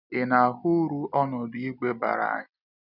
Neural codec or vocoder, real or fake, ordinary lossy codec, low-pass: none; real; none; 5.4 kHz